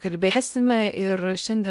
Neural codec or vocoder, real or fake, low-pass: codec, 16 kHz in and 24 kHz out, 0.8 kbps, FocalCodec, streaming, 65536 codes; fake; 10.8 kHz